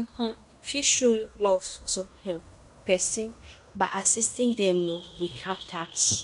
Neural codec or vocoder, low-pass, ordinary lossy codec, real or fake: codec, 16 kHz in and 24 kHz out, 0.9 kbps, LongCat-Audio-Codec, fine tuned four codebook decoder; 10.8 kHz; none; fake